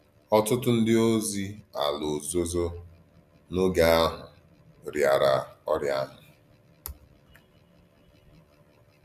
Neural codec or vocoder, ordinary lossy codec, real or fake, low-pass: none; none; real; 14.4 kHz